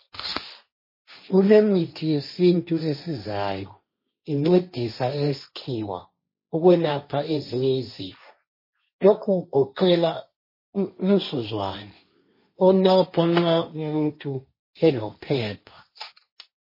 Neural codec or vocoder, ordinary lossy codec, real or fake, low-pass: codec, 16 kHz, 1.1 kbps, Voila-Tokenizer; MP3, 24 kbps; fake; 5.4 kHz